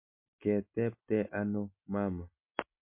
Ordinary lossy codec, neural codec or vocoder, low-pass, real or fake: MP3, 32 kbps; none; 3.6 kHz; real